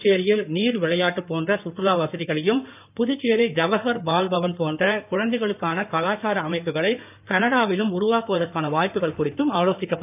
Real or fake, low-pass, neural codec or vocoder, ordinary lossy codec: fake; 3.6 kHz; codec, 16 kHz in and 24 kHz out, 2.2 kbps, FireRedTTS-2 codec; none